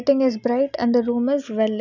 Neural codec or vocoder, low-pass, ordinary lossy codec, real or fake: none; 7.2 kHz; none; real